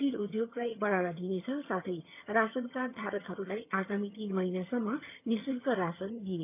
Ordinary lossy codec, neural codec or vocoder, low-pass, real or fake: none; vocoder, 22.05 kHz, 80 mel bands, HiFi-GAN; 3.6 kHz; fake